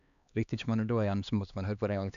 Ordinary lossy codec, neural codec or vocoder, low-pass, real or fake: none; codec, 16 kHz, 2 kbps, X-Codec, HuBERT features, trained on LibriSpeech; 7.2 kHz; fake